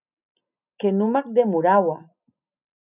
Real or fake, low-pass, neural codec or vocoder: real; 3.6 kHz; none